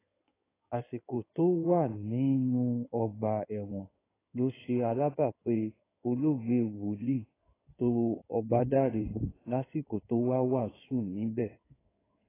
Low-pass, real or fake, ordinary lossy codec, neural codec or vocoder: 3.6 kHz; fake; AAC, 16 kbps; codec, 16 kHz in and 24 kHz out, 2.2 kbps, FireRedTTS-2 codec